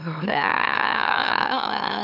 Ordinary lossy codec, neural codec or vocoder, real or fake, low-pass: none; autoencoder, 44.1 kHz, a latent of 192 numbers a frame, MeloTTS; fake; 5.4 kHz